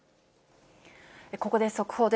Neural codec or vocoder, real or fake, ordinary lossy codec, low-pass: none; real; none; none